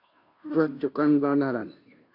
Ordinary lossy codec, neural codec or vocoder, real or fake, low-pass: AAC, 48 kbps; codec, 16 kHz, 0.5 kbps, FunCodec, trained on Chinese and English, 25 frames a second; fake; 5.4 kHz